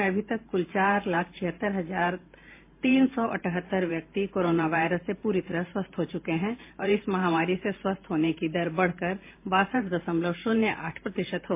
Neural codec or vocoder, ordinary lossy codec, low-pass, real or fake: vocoder, 44.1 kHz, 128 mel bands every 512 samples, BigVGAN v2; MP3, 24 kbps; 3.6 kHz; fake